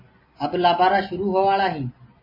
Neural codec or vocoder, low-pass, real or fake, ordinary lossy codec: none; 5.4 kHz; real; MP3, 24 kbps